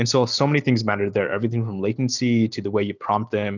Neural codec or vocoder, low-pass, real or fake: none; 7.2 kHz; real